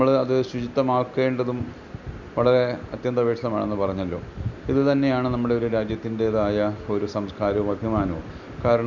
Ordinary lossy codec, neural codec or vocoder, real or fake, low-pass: none; none; real; 7.2 kHz